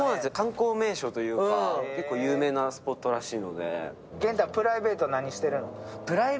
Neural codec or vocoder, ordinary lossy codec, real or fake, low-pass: none; none; real; none